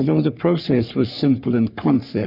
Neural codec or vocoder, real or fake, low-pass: codec, 44.1 kHz, 3.4 kbps, Pupu-Codec; fake; 5.4 kHz